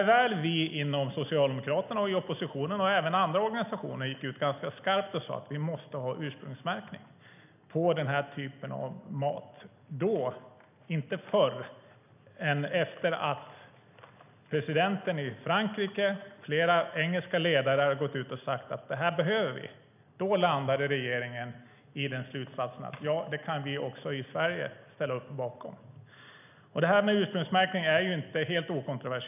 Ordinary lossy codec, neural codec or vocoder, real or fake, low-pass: none; none; real; 3.6 kHz